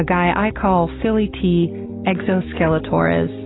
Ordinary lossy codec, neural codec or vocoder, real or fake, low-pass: AAC, 16 kbps; none; real; 7.2 kHz